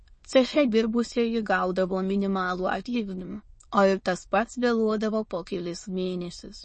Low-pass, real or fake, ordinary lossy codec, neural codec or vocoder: 9.9 kHz; fake; MP3, 32 kbps; autoencoder, 22.05 kHz, a latent of 192 numbers a frame, VITS, trained on many speakers